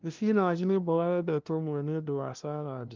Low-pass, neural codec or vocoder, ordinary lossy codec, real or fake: 7.2 kHz; codec, 16 kHz, 0.5 kbps, FunCodec, trained on LibriTTS, 25 frames a second; Opus, 24 kbps; fake